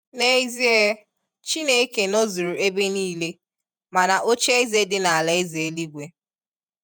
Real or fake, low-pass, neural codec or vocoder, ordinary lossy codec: fake; none; vocoder, 48 kHz, 128 mel bands, Vocos; none